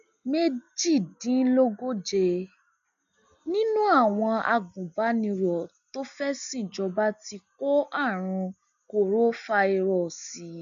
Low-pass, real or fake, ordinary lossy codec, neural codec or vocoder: 7.2 kHz; real; none; none